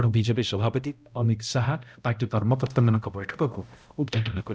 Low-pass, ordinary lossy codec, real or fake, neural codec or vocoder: none; none; fake; codec, 16 kHz, 0.5 kbps, X-Codec, HuBERT features, trained on balanced general audio